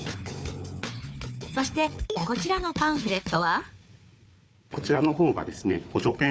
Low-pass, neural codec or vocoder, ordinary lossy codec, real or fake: none; codec, 16 kHz, 4 kbps, FunCodec, trained on Chinese and English, 50 frames a second; none; fake